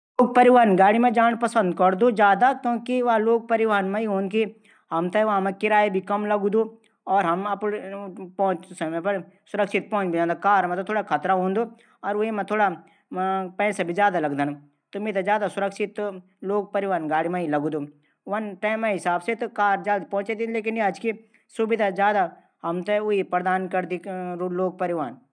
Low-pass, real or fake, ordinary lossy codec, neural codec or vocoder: 9.9 kHz; real; none; none